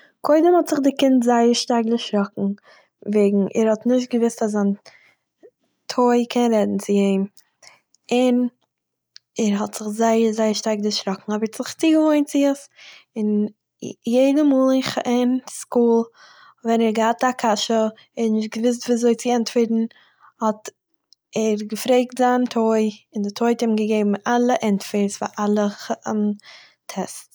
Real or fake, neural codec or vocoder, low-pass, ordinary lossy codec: real; none; none; none